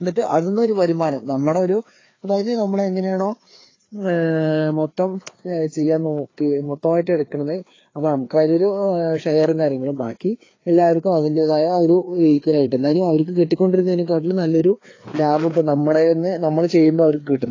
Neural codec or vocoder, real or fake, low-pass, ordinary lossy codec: codec, 16 kHz, 2 kbps, FreqCodec, larger model; fake; 7.2 kHz; AAC, 32 kbps